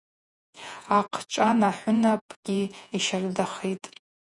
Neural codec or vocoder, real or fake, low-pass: vocoder, 48 kHz, 128 mel bands, Vocos; fake; 10.8 kHz